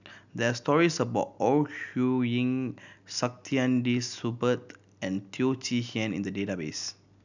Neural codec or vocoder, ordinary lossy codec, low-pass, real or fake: none; none; 7.2 kHz; real